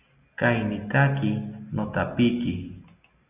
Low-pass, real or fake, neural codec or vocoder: 3.6 kHz; real; none